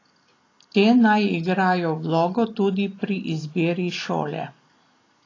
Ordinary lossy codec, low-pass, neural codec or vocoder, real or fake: AAC, 32 kbps; 7.2 kHz; none; real